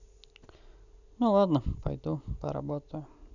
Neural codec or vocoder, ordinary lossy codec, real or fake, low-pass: none; none; real; 7.2 kHz